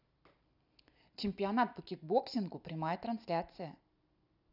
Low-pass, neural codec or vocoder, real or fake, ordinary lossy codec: 5.4 kHz; none; real; none